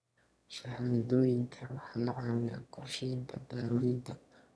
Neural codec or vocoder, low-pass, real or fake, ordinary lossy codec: autoencoder, 22.05 kHz, a latent of 192 numbers a frame, VITS, trained on one speaker; none; fake; none